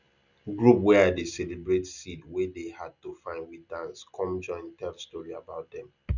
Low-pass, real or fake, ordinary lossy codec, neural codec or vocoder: 7.2 kHz; real; none; none